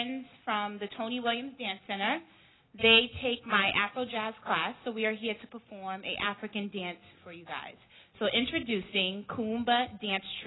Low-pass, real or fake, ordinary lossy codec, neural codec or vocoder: 7.2 kHz; real; AAC, 16 kbps; none